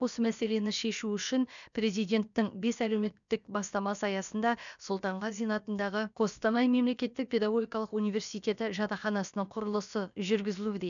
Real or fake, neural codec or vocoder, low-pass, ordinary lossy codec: fake; codec, 16 kHz, about 1 kbps, DyCAST, with the encoder's durations; 7.2 kHz; none